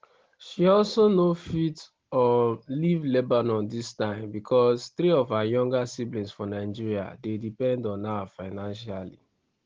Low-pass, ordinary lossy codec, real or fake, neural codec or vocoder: 7.2 kHz; Opus, 16 kbps; real; none